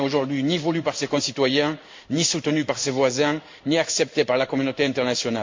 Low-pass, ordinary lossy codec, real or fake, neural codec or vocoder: 7.2 kHz; MP3, 48 kbps; fake; codec, 16 kHz in and 24 kHz out, 1 kbps, XY-Tokenizer